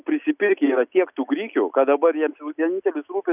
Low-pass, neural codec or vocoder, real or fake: 3.6 kHz; none; real